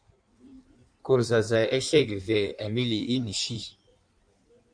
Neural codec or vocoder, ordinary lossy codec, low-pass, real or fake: codec, 16 kHz in and 24 kHz out, 1.1 kbps, FireRedTTS-2 codec; MP3, 64 kbps; 9.9 kHz; fake